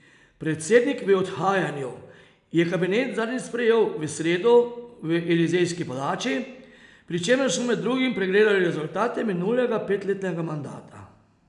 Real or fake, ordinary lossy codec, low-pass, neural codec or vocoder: real; none; 10.8 kHz; none